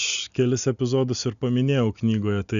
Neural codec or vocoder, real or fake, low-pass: none; real; 7.2 kHz